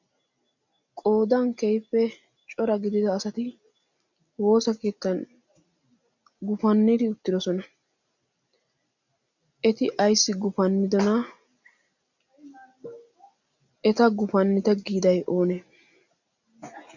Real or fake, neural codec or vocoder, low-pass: real; none; 7.2 kHz